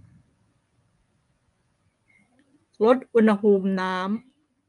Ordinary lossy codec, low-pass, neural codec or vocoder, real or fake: none; 10.8 kHz; vocoder, 24 kHz, 100 mel bands, Vocos; fake